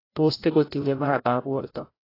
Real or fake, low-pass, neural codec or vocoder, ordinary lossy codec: fake; 5.4 kHz; codec, 16 kHz, 0.5 kbps, FreqCodec, larger model; AAC, 24 kbps